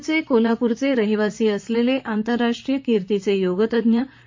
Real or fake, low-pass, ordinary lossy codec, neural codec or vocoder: fake; 7.2 kHz; MP3, 48 kbps; vocoder, 44.1 kHz, 128 mel bands, Pupu-Vocoder